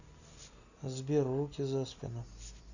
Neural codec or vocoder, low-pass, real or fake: none; 7.2 kHz; real